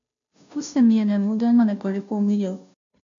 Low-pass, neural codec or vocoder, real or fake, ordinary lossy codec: 7.2 kHz; codec, 16 kHz, 0.5 kbps, FunCodec, trained on Chinese and English, 25 frames a second; fake; MP3, 96 kbps